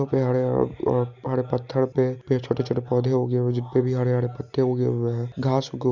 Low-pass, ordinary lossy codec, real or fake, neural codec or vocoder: 7.2 kHz; none; real; none